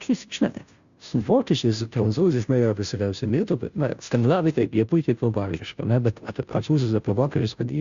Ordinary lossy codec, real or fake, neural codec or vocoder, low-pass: Opus, 64 kbps; fake; codec, 16 kHz, 0.5 kbps, FunCodec, trained on Chinese and English, 25 frames a second; 7.2 kHz